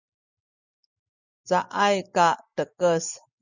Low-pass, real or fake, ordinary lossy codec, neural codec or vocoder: 7.2 kHz; real; Opus, 64 kbps; none